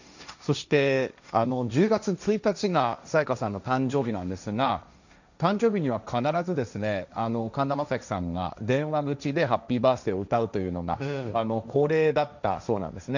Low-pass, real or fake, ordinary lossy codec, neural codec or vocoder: 7.2 kHz; fake; none; codec, 16 kHz, 1.1 kbps, Voila-Tokenizer